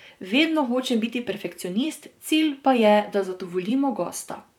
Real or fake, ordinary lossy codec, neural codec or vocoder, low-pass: fake; none; vocoder, 44.1 kHz, 128 mel bands, Pupu-Vocoder; 19.8 kHz